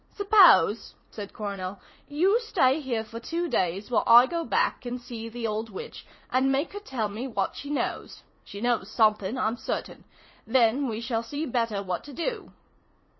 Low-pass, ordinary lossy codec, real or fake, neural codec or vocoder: 7.2 kHz; MP3, 24 kbps; fake; vocoder, 44.1 kHz, 80 mel bands, Vocos